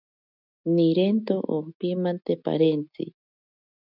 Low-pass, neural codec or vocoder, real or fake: 5.4 kHz; none; real